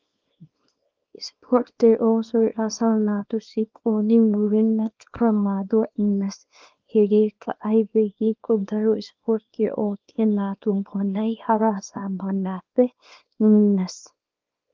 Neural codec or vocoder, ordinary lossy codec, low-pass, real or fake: codec, 24 kHz, 0.9 kbps, WavTokenizer, small release; Opus, 24 kbps; 7.2 kHz; fake